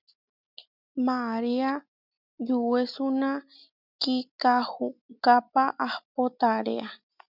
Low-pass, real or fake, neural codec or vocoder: 5.4 kHz; real; none